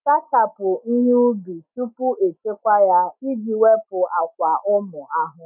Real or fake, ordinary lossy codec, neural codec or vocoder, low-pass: real; none; none; 3.6 kHz